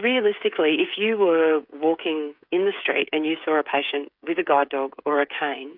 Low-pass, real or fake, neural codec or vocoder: 5.4 kHz; fake; codec, 16 kHz, 8 kbps, FreqCodec, smaller model